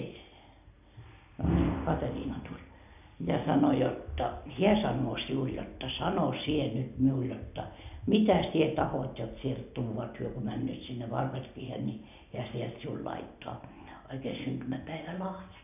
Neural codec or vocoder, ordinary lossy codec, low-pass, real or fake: none; none; 3.6 kHz; real